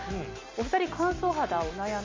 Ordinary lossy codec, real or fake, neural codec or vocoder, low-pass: MP3, 48 kbps; real; none; 7.2 kHz